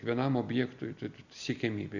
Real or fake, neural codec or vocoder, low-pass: real; none; 7.2 kHz